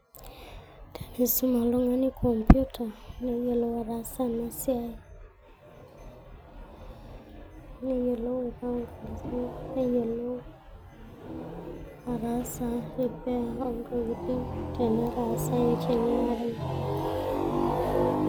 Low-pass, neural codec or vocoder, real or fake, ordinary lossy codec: none; none; real; none